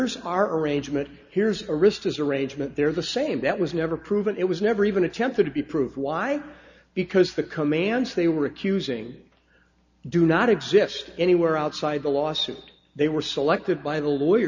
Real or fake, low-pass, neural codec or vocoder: real; 7.2 kHz; none